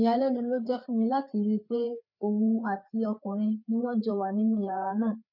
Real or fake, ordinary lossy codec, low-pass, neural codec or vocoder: fake; none; 5.4 kHz; codec, 16 kHz, 4 kbps, FreqCodec, larger model